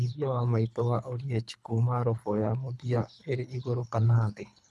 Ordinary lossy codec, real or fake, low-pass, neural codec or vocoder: none; fake; none; codec, 24 kHz, 3 kbps, HILCodec